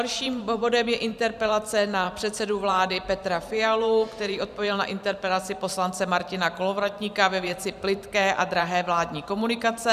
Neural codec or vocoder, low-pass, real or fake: vocoder, 44.1 kHz, 128 mel bands every 512 samples, BigVGAN v2; 14.4 kHz; fake